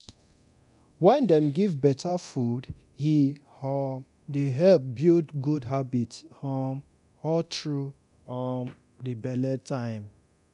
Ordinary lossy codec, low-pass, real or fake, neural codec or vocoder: none; 10.8 kHz; fake; codec, 24 kHz, 0.9 kbps, DualCodec